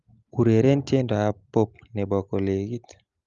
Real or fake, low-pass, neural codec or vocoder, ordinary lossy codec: real; 7.2 kHz; none; Opus, 32 kbps